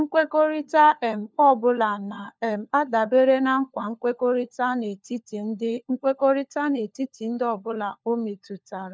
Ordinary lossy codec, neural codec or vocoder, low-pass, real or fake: none; codec, 16 kHz, 4 kbps, FunCodec, trained on LibriTTS, 50 frames a second; none; fake